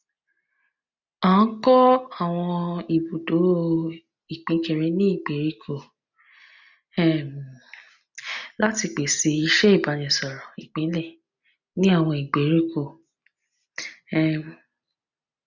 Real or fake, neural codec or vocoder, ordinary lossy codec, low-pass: real; none; none; 7.2 kHz